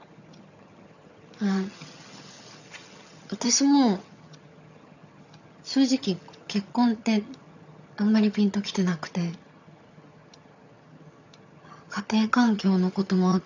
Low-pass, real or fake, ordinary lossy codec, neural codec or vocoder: 7.2 kHz; fake; none; vocoder, 22.05 kHz, 80 mel bands, HiFi-GAN